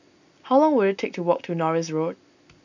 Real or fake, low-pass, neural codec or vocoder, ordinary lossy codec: real; 7.2 kHz; none; none